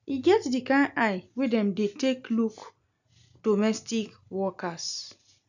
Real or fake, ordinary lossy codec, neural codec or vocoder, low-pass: real; none; none; 7.2 kHz